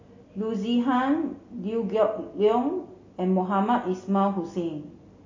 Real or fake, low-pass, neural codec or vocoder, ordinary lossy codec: real; 7.2 kHz; none; MP3, 32 kbps